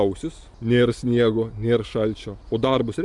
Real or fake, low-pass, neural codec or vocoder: real; 10.8 kHz; none